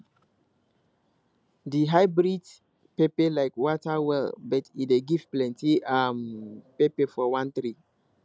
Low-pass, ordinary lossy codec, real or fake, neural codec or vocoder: none; none; real; none